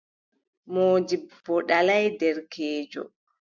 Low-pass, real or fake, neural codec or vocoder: 7.2 kHz; real; none